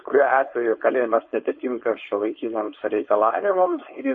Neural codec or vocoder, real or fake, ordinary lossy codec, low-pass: codec, 16 kHz, 4.8 kbps, FACodec; fake; MP3, 32 kbps; 7.2 kHz